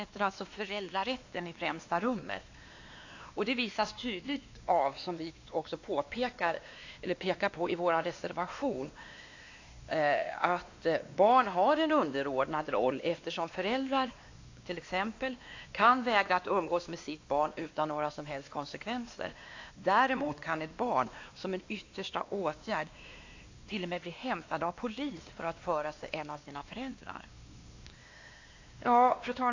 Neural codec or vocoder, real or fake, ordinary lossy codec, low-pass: codec, 16 kHz, 2 kbps, X-Codec, WavLM features, trained on Multilingual LibriSpeech; fake; AAC, 48 kbps; 7.2 kHz